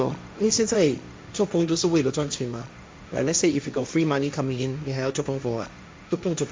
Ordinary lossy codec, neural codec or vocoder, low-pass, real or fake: none; codec, 16 kHz, 1.1 kbps, Voila-Tokenizer; none; fake